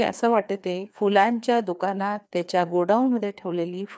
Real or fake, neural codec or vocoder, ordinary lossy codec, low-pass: fake; codec, 16 kHz, 2 kbps, FreqCodec, larger model; none; none